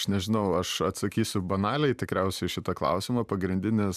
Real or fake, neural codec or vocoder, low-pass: fake; vocoder, 44.1 kHz, 128 mel bands every 512 samples, BigVGAN v2; 14.4 kHz